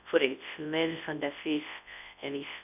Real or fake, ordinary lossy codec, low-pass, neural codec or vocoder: fake; none; 3.6 kHz; codec, 24 kHz, 0.9 kbps, WavTokenizer, large speech release